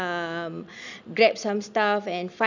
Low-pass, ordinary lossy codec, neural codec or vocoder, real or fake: 7.2 kHz; none; none; real